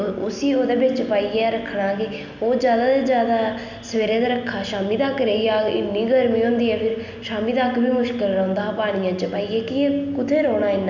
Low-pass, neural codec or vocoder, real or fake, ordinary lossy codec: 7.2 kHz; none; real; none